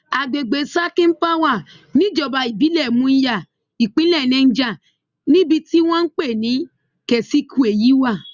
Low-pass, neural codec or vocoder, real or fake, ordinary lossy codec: 7.2 kHz; none; real; Opus, 64 kbps